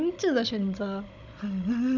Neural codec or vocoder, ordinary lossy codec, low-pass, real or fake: codec, 16 kHz, 16 kbps, FunCodec, trained on Chinese and English, 50 frames a second; none; 7.2 kHz; fake